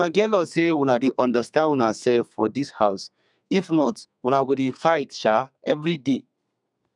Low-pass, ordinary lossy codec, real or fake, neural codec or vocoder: 10.8 kHz; none; fake; codec, 32 kHz, 1.9 kbps, SNAC